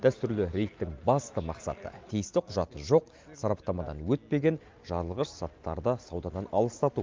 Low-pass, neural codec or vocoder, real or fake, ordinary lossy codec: 7.2 kHz; none; real; Opus, 24 kbps